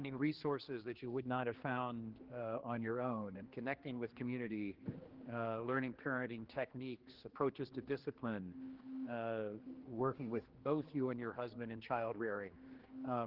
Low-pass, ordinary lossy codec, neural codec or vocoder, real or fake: 5.4 kHz; Opus, 16 kbps; codec, 16 kHz, 2 kbps, X-Codec, HuBERT features, trained on general audio; fake